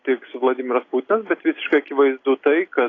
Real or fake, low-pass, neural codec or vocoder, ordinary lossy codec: real; 7.2 kHz; none; AAC, 32 kbps